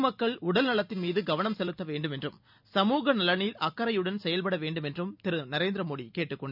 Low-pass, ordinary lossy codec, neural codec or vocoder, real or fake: 5.4 kHz; none; none; real